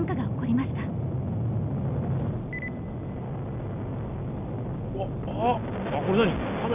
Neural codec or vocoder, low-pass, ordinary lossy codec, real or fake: none; 3.6 kHz; none; real